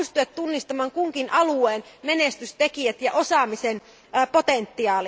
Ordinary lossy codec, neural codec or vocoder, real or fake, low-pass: none; none; real; none